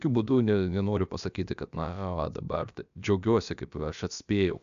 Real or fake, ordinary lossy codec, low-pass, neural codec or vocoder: fake; AAC, 96 kbps; 7.2 kHz; codec, 16 kHz, about 1 kbps, DyCAST, with the encoder's durations